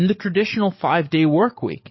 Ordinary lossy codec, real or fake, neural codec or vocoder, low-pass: MP3, 24 kbps; fake; codec, 44.1 kHz, 7.8 kbps, DAC; 7.2 kHz